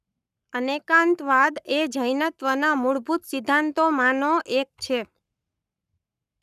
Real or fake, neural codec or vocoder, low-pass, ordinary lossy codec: fake; codec, 44.1 kHz, 7.8 kbps, Pupu-Codec; 14.4 kHz; none